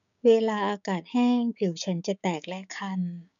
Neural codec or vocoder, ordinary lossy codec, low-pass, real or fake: codec, 16 kHz, 6 kbps, DAC; none; 7.2 kHz; fake